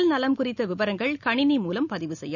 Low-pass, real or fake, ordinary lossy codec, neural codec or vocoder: 7.2 kHz; real; none; none